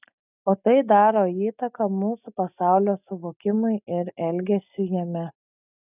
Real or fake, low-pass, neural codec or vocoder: real; 3.6 kHz; none